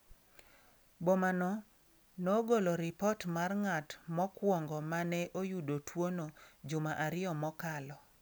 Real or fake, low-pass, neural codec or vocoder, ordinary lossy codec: real; none; none; none